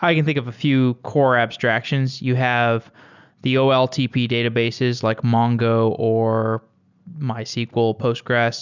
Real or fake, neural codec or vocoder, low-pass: real; none; 7.2 kHz